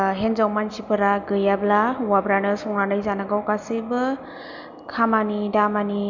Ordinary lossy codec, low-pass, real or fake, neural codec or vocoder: none; 7.2 kHz; real; none